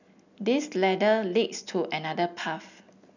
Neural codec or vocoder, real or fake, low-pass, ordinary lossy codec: none; real; 7.2 kHz; none